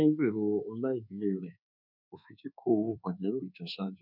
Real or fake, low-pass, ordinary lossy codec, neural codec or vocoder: fake; 5.4 kHz; none; codec, 24 kHz, 1.2 kbps, DualCodec